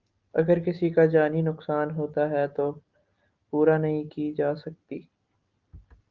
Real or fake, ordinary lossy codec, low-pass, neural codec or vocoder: real; Opus, 32 kbps; 7.2 kHz; none